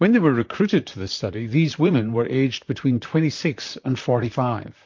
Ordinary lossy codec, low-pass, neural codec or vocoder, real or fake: MP3, 48 kbps; 7.2 kHz; vocoder, 44.1 kHz, 128 mel bands, Pupu-Vocoder; fake